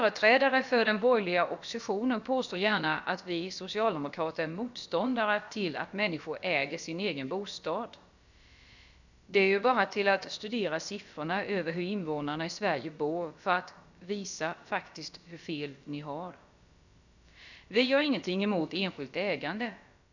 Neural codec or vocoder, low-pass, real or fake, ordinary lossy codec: codec, 16 kHz, about 1 kbps, DyCAST, with the encoder's durations; 7.2 kHz; fake; none